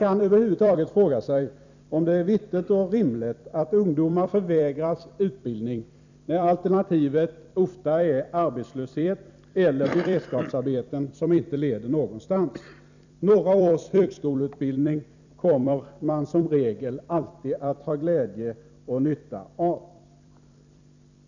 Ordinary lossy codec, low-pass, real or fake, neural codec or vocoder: none; 7.2 kHz; fake; vocoder, 44.1 kHz, 128 mel bands every 256 samples, BigVGAN v2